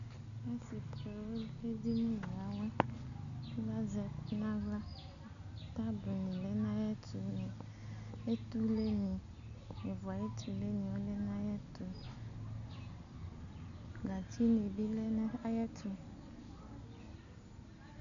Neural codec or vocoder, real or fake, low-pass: none; real; 7.2 kHz